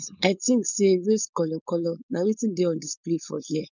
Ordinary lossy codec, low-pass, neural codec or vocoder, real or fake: none; 7.2 kHz; codec, 16 kHz, 4.8 kbps, FACodec; fake